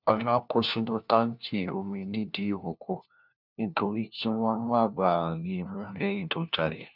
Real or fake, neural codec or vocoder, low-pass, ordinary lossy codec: fake; codec, 16 kHz, 1 kbps, FunCodec, trained on LibriTTS, 50 frames a second; 5.4 kHz; none